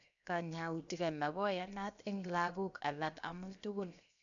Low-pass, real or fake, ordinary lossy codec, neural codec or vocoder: 7.2 kHz; fake; none; codec, 16 kHz, 0.7 kbps, FocalCodec